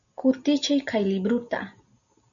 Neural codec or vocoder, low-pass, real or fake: none; 7.2 kHz; real